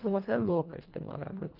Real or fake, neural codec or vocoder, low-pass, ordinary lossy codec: fake; codec, 24 kHz, 1.5 kbps, HILCodec; 5.4 kHz; Opus, 32 kbps